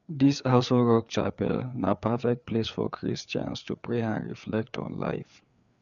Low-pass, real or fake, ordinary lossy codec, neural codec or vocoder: 7.2 kHz; fake; none; codec, 16 kHz, 4 kbps, FreqCodec, larger model